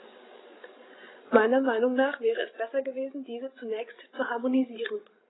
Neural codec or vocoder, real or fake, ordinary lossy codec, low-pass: codec, 16 kHz, 16 kbps, FreqCodec, larger model; fake; AAC, 16 kbps; 7.2 kHz